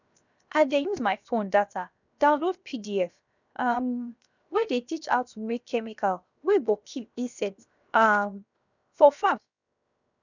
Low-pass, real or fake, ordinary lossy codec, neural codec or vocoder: 7.2 kHz; fake; none; codec, 16 kHz, 0.7 kbps, FocalCodec